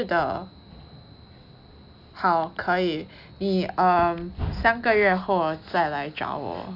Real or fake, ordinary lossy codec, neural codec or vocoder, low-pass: real; none; none; 5.4 kHz